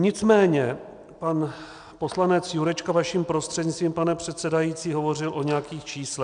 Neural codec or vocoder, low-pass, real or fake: none; 9.9 kHz; real